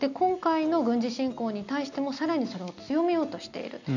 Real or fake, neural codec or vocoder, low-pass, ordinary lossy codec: real; none; 7.2 kHz; none